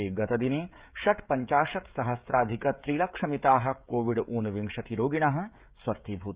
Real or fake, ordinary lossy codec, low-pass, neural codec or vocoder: fake; Opus, 64 kbps; 3.6 kHz; codec, 16 kHz, 16 kbps, FreqCodec, smaller model